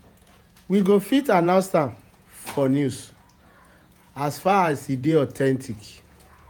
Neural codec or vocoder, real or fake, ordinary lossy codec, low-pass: vocoder, 48 kHz, 128 mel bands, Vocos; fake; none; none